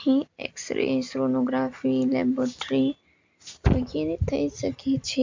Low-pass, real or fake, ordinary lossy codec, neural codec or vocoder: 7.2 kHz; real; MP3, 64 kbps; none